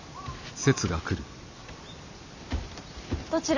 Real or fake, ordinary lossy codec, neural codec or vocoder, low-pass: real; none; none; 7.2 kHz